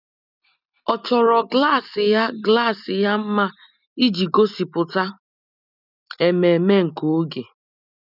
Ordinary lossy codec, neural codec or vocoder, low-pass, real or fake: none; none; 5.4 kHz; real